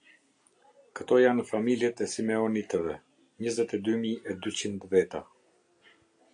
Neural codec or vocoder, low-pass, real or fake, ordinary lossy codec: none; 9.9 kHz; real; AAC, 64 kbps